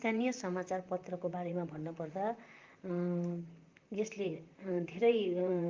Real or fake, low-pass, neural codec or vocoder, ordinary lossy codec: fake; 7.2 kHz; vocoder, 44.1 kHz, 128 mel bands, Pupu-Vocoder; Opus, 24 kbps